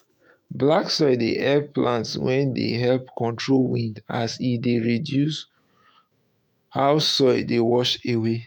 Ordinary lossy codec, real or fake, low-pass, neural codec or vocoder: none; fake; none; autoencoder, 48 kHz, 128 numbers a frame, DAC-VAE, trained on Japanese speech